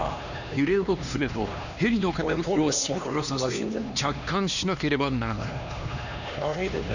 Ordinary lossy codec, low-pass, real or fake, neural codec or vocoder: none; 7.2 kHz; fake; codec, 16 kHz, 2 kbps, X-Codec, HuBERT features, trained on LibriSpeech